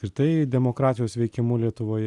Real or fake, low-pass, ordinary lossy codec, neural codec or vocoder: real; 10.8 kHz; Opus, 64 kbps; none